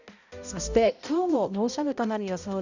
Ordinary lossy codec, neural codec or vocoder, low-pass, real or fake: none; codec, 16 kHz, 0.5 kbps, X-Codec, HuBERT features, trained on balanced general audio; 7.2 kHz; fake